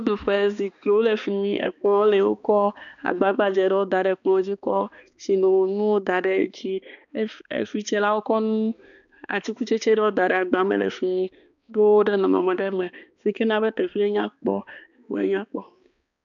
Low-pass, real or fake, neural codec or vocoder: 7.2 kHz; fake; codec, 16 kHz, 2 kbps, X-Codec, HuBERT features, trained on balanced general audio